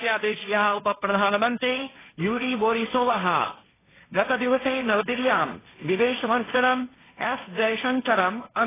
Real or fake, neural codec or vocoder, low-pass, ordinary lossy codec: fake; codec, 16 kHz, 1.1 kbps, Voila-Tokenizer; 3.6 kHz; AAC, 16 kbps